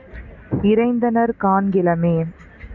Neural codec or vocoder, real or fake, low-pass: none; real; 7.2 kHz